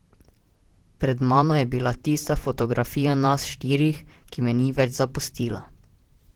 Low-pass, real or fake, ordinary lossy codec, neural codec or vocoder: 19.8 kHz; fake; Opus, 16 kbps; vocoder, 44.1 kHz, 128 mel bands, Pupu-Vocoder